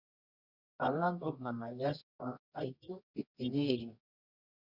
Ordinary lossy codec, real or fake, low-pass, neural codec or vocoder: Opus, 64 kbps; fake; 5.4 kHz; codec, 24 kHz, 0.9 kbps, WavTokenizer, medium music audio release